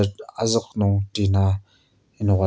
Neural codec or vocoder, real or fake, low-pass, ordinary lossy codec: none; real; none; none